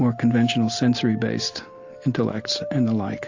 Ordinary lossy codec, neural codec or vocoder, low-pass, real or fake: AAC, 48 kbps; none; 7.2 kHz; real